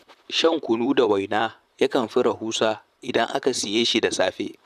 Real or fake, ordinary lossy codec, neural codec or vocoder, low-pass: fake; none; vocoder, 44.1 kHz, 128 mel bands, Pupu-Vocoder; 14.4 kHz